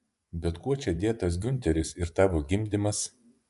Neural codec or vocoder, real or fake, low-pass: vocoder, 24 kHz, 100 mel bands, Vocos; fake; 10.8 kHz